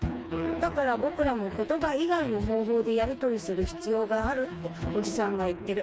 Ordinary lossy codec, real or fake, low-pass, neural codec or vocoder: none; fake; none; codec, 16 kHz, 2 kbps, FreqCodec, smaller model